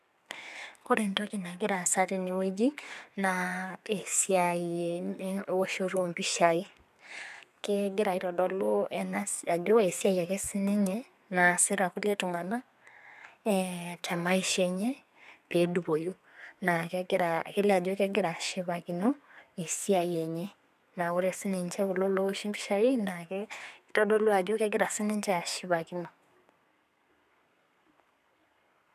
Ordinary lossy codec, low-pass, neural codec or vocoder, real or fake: none; 14.4 kHz; codec, 32 kHz, 1.9 kbps, SNAC; fake